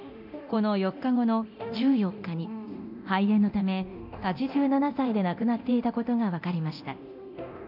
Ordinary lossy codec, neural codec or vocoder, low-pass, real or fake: AAC, 48 kbps; codec, 24 kHz, 0.9 kbps, DualCodec; 5.4 kHz; fake